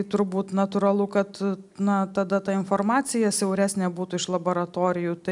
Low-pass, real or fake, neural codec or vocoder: 10.8 kHz; real; none